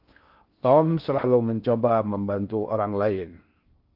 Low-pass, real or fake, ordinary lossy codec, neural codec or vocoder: 5.4 kHz; fake; Opus, 32 kbps; codec, 16 kHz in and 24 kHz out, 0.6 kbps, FocalCodec, streaming, 2048 codes